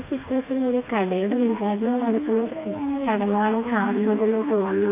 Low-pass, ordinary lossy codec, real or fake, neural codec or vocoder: 3.6 kHz; MP3, 24 kbps; fake; codec, 16 kHz, 2 kbps, FreqCodec, smaller model